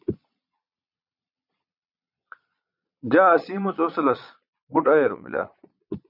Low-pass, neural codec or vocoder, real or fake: 5.4 kHz; vocoder, 22.05 kHz, 80 mel bands, Vocos; fake